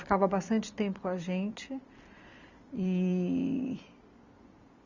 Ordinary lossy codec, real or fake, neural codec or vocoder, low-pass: none; fake; vocoder, 44.1 kHz, 128 mel bands every 256 samples, BigVGAN v2; 7.2 kHz